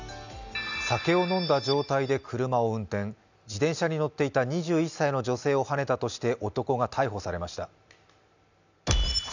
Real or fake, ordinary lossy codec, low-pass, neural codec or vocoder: real; none; 7.2 kHz; none